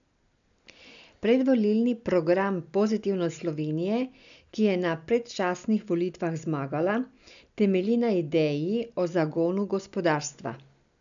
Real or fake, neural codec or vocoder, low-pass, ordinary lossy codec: real; none; 7.2 kHz; none